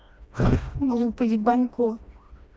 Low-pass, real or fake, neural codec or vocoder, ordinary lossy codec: none; fake; codec, 16 kHz, 1 kbps, FreqCodec, smaller model; none